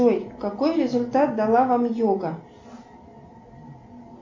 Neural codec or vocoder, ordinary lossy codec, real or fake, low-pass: none; MP3, 64 kbps; real; 7.2 kHz